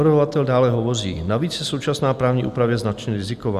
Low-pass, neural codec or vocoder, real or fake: 14.4 kHz; none; real